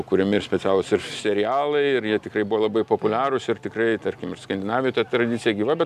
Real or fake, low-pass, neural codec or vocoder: real; 14.4 kHz; none